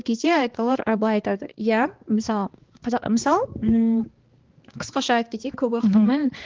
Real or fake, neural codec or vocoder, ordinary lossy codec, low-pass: fake; codec, 16 kHz, 2 kbps, X-Codec, HuBERT features, trained on balanced general audio; Opus, 16 kbps; 7.2 kHz